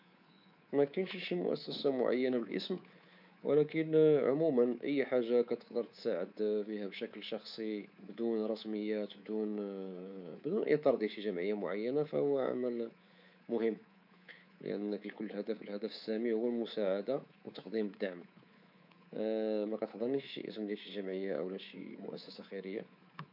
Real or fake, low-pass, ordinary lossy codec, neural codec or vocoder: fake; 5.4 kHz; none; codec, 24 kHz, 3.1 kbps, DualCodec